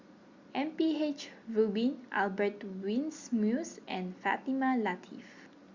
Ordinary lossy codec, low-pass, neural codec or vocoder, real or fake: Opus, 64 kbps; 7.2 kHz; none; real